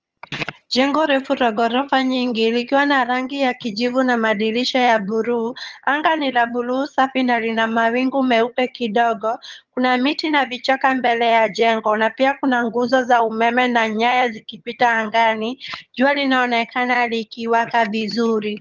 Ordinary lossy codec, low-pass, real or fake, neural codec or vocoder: Opus, 24 kbps; 7.2 kHz; fake; vocoder, 22.05 kHz, 80 mel bands, HiFi-GAN